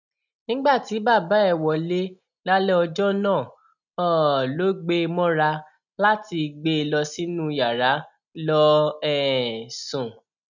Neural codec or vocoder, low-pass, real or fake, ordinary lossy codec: none; 7.2 kHz; real; none